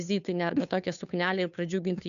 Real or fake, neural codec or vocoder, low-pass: fake; codec, 16 kHz, 2 kbps, FunCodec, trained on Chinese and English, 25 frames a second; 7.2 kHz